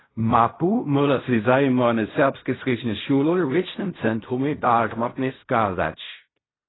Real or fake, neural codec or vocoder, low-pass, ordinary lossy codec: fake; codec, 16 kHz in and 24 kHz out, 0.4 kbps, LongCat-Audio-Codec, fine tuned four codebook decoder; 7.2 kHz; AAC, 16 kbps